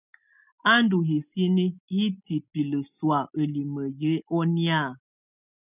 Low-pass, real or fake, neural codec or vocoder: 3.6 kHz; real; none